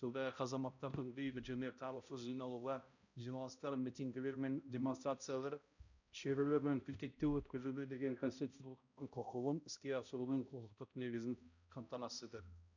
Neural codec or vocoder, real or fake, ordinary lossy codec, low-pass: codec, 16 kHz, 0.5 kbps, X-Codec, HuBERT features, trained on balanced general audio; fake; none; 7.2 kHz